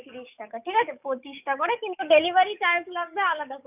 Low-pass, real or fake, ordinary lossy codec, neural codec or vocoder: 3.6 kHz; fake; none; codec, 44.1 kHz, 7.8 kbps, DAC